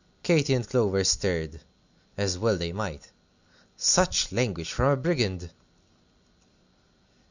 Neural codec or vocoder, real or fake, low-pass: none; real; 7.2 kHz